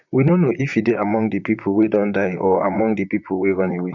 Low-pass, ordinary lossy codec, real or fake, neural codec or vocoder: 7.2 kHz; none; fake; vocoder, 44.1 kHz, 128 mel bands, Pupu-Vocoder